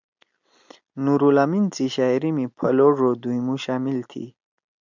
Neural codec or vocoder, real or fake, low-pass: none; real; 7.2 kHz